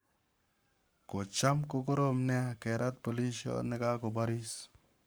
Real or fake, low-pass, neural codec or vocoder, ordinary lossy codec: fake; none; codec, 44.1 kHz, 7.8 kbps, Pupu-Codec; none